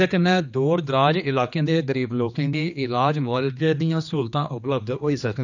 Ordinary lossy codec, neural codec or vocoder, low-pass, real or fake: none; codec, 16 kHz, 2 kbps, X-Codec, HuBERT features, trained on general audio; 7.2 kHz; fake